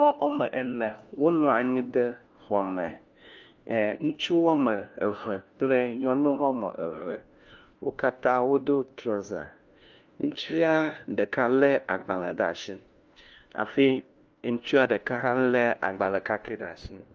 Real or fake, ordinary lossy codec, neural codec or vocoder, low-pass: fake; Opus, 24 kbps; codec, 16 kHz, 1 kbps, FunCodec, trained on LibriTTS, 50 frames a second; 7.2 kHz